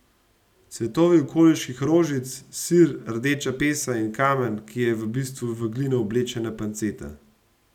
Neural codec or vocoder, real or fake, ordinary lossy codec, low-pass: none; real; none; 19.8 kHz